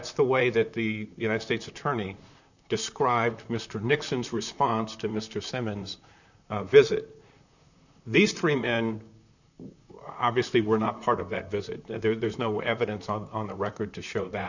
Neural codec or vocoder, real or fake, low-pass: vocoder, 44.1 kHz, 128 mel bands, Pupu-Vocoder; fake; 7.2 kHz